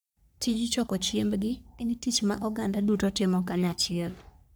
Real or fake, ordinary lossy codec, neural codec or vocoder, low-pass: fake; none; codec, 44.1 kHz, 3.4 kbps, Pupu-Codec; none